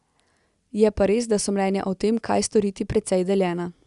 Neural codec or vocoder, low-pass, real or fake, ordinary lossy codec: none; 10.8 kHz; real; Opus, 64 kbps